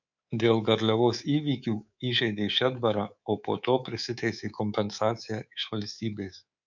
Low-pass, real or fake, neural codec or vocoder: 7.2 kHz; fake; codec, 24 kHz, 3.1 kbps, DualCodec